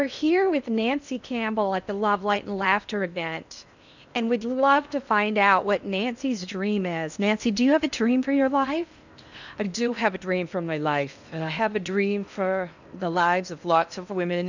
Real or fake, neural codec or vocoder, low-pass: fake; codec, 16 kHz in and 24 kHz out, 0.8 kbps, FocalCodec, streaming, 65536 codes; 7.2 kHz